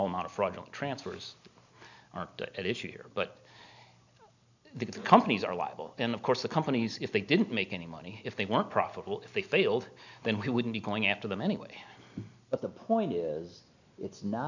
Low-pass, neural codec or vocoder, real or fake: 7.2 kHz; none; real